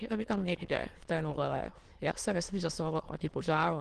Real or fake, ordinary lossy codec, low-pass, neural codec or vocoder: fake; Opus, 16 kbps; 9.9 kHz; autoencoder, 22.05 kHz, a latent of 192 numbers a frame, VITS, trained on many speakers